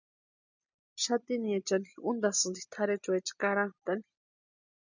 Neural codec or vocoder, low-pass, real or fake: none; 7.2 kHz; real